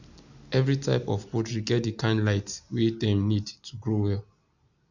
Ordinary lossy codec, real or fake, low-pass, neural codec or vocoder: none; real; 7.2 kHz; none